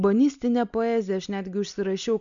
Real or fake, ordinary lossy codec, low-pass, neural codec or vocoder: real; MP3, 96 kbps; 7.2 kHz; none